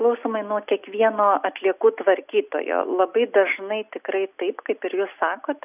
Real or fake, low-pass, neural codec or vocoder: real; 3.6 kHz; none